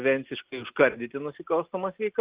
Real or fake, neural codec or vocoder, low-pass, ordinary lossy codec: real; none; 3.6 kHz; Opus, 32 kbps